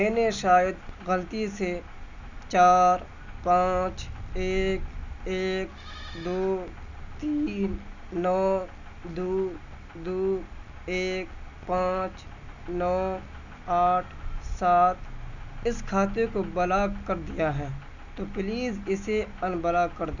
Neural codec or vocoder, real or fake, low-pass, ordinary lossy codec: none; real; 7.2 kHz; none